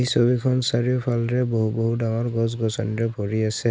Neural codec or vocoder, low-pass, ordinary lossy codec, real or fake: none; none; none; real